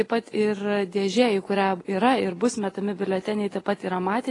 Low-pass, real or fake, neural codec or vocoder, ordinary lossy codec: 10.8 kHz; real; none; AAC, 32 kbps